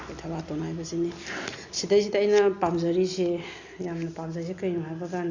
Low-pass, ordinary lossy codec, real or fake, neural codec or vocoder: 7.2 kHz; Opus, 64 kbps; real; none